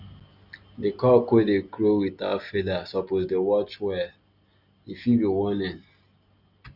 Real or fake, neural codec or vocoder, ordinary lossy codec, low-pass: real; none; none; 5.4 kHz